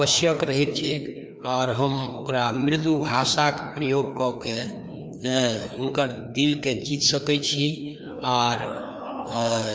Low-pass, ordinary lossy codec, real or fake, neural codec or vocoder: none; none; fake; codec, 16 kHz, 2 kbps, FreqCodec, larger model